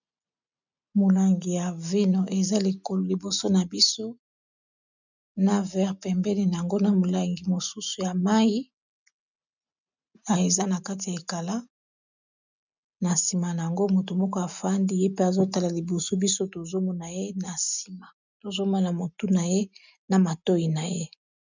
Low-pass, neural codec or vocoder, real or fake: 7.2 kHz; none; real